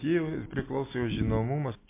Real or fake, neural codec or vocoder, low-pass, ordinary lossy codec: real; none; 3.6 kHz; MP3, 24 kbps